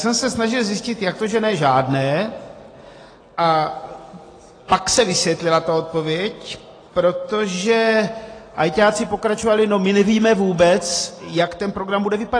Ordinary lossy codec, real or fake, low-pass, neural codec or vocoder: AAC, 32 kbps; real; 9.9 kHz; none